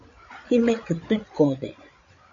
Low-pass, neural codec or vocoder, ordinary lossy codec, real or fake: 7.2 kHz; codec, 16 kHz, 16 kbps, FreqCodec, larger model; MP3, 48 kbps; fake